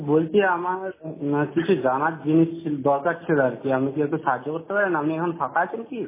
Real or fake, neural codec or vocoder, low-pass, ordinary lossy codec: real; none; 3.6 kHz; MP3, 16 kbps